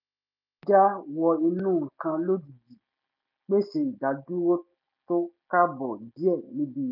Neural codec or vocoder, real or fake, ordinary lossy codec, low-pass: none; real; none; 5.4 kHz